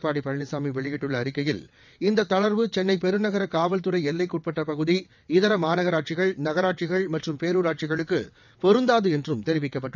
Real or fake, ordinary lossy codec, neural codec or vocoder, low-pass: fake; none; vocoder, 22.05 kHz, 80 mel bands, WaveNeXt; 7.2 kHz